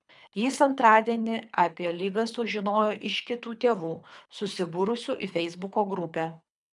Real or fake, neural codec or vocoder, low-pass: fake; codec, 24 kHz, 3 kbps, HILCodec; 10.8 kHz